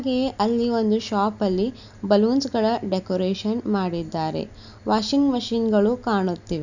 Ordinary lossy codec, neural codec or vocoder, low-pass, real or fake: none; none; 7.2 kHz; real